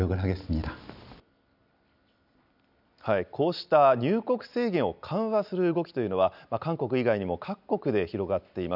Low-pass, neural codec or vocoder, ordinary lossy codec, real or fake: 5.4 kHz; none; none; real